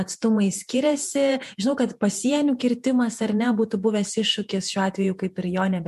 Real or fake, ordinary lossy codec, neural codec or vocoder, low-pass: fake; MP3, 64 kbps; vocoder, 48 kHz, 128 mel bands, Vocos; 14.4 kHz